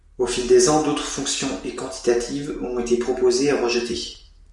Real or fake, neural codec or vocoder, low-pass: real; none; 10.8 kHz